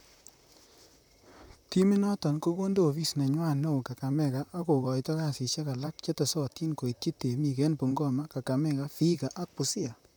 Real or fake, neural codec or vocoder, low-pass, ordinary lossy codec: fake; vocoder, 44.1 kHz, 128 mel bands, Pupu-Vocoder; none; none